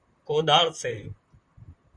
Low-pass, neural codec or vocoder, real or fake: 9.9 kHz; vocoder, 44.1 kHz, 128 mel bands, Pupu-Vocoder; fake